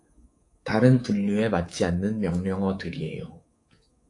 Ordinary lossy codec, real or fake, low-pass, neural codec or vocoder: AAC, 48 kbps; fake; 10.8 kHz; codec, 24 kHz, 3.1 kbps, DualCodec